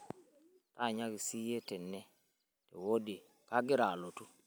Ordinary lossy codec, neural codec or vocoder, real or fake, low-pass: none; none; real; none